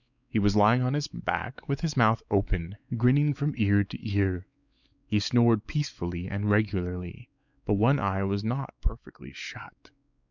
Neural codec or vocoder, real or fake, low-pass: codec, 16 kHz, 4 kbps, X-Codec, WavLM features, trained on Multilingual LibriSpeech; fake; 7.2 kHz